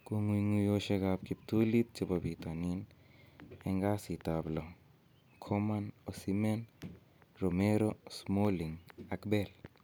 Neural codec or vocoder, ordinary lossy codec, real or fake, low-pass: none; none; real; none